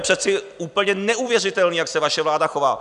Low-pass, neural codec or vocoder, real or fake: 10.8 kHz; none; real